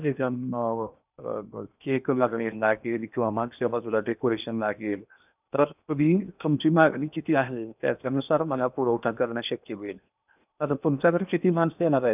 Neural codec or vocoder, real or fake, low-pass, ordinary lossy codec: codec, 16 kHz in and 24 kHz out, 0.8 kbps, FocalCodec, streaming, 65536 codes; fake; 3.6 kHz; none